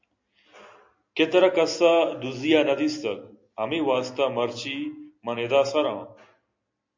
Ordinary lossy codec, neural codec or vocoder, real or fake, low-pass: MP3, 48 kbps; none; real; 7.2 kHz